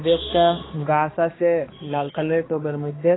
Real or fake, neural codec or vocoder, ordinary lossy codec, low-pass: fake; codec, 16 kHz, 2 kbps, X-Codec, HuBERT features, trained on balanced general audio; AAC, 16 kbps; 7.2 kHz